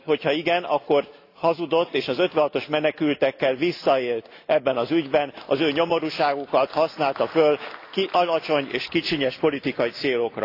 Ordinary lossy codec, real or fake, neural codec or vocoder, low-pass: AAC, 32 kbps; real; none; 5.4 kHz